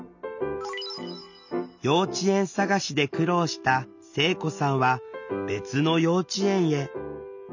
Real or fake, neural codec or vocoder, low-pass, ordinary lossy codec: real; none; 7.2 kHz; none